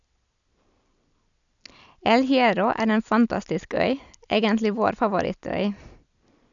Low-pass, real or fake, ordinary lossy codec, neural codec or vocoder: 7.2 kHz; real; none; none